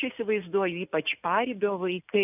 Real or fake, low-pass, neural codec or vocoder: real; 3.6 kHz; none